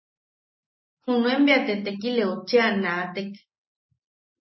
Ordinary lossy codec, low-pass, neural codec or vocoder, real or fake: MP3, 24 kbps; 7.2 kHz; none; real